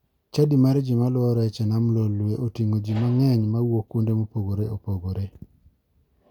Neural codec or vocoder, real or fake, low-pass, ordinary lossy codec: none; real; 19.8 kHz; none